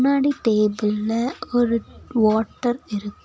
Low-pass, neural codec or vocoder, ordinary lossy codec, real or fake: none; none; none; real